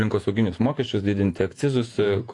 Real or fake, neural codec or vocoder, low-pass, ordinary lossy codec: fake; vocoder, 48 kHz, 128 mel bands, Vocos; 10.8 kHz; AAC, 48 kbps